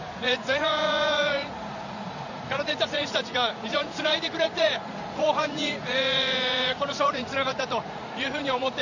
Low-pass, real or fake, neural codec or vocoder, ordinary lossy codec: 7.2 kHz; fake; vocoder, 44.1 kHz, 80 mel bands, Vocos; none